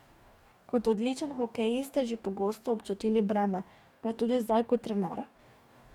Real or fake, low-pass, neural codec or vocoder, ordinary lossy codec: fake; 19.8 kHz; codec, 44.1 kHz, 2.6 kbps, DAC; none